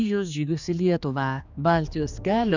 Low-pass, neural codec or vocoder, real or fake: 7.2 kHz; codec, 16 kHz, 2 kbps, X-Codec, HuBERT features, trained on general audio; fake